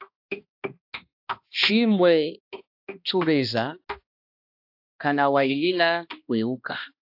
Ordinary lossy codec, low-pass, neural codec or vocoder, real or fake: AAC, 48 kbps; 5.4 kHz; codec, 16 kHz, 1 kbps, X-Codec, HuBERT features, trained on balanced general audio; fake